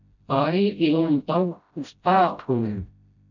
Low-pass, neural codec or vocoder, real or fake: 7.2 kHz; codec, 16 kHz, 0.5 kbps, FreqCodec, smaller model; fake